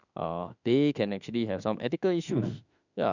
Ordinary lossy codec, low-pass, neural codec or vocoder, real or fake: none; 7.2 kHz; autoencoder, 48 kHz, 32 numbers a frame, DAC-VAE, trained on Japanese speech; fake